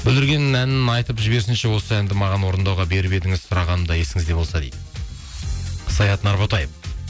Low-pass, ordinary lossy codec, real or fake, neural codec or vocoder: none; none; real; none